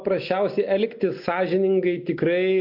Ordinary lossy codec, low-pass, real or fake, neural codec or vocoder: MP3, 48 kbps; 5.4 kHz; real; none